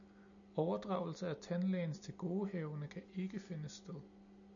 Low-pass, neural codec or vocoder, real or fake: 7.2 kHz; none; real